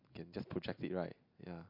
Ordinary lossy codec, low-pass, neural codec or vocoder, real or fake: MP3, 48 kbps; 5.4 kHz; none; real